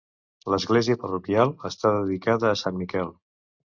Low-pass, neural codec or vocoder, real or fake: 7.2 kHz; none; real